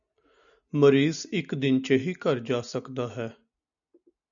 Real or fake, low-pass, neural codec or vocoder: real; 7.2 kHz; none